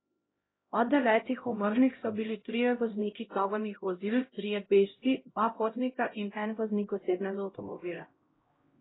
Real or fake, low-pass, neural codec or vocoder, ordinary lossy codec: fake; 7.2 kHz; codec, 16 kHz, 0.5 kbps, X-Codec, HuBERT features, trained on LibriSpeech; AAC, 16 kbps